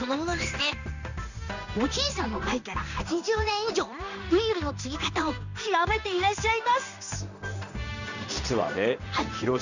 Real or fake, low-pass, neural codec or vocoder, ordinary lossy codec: fake; 7.2 kHz; codec, 16 kHz in and 24 kHz out, 1 kbps, XY-Tokenizer; none